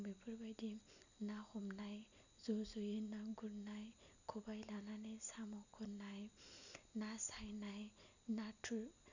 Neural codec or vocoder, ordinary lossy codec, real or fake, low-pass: none; none; real; 7.2 kHz